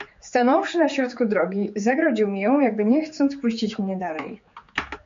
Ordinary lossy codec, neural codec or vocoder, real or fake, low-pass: MP3, 48 kbps; codec, 16 kHz, 4 kbps, X-Codec, HuBERT features, trained on general audio; fake; 7.2 kHz